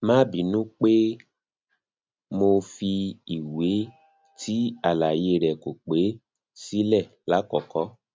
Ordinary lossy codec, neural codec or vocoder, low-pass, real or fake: none; none; none; real